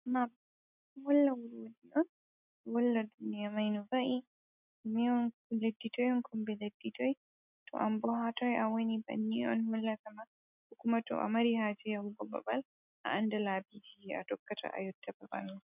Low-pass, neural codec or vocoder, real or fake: 3.6 kHz; none; real